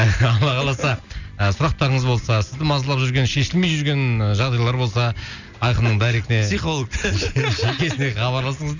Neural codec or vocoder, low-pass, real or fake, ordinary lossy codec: none; 7.2 kHz; real; none